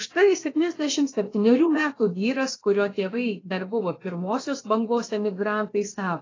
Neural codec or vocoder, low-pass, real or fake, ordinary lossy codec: codec, 16 kHz, about 1 kbps, DyCAST, with the encoder's durations; 7.2 kHz; fake; AAC, 32 kbps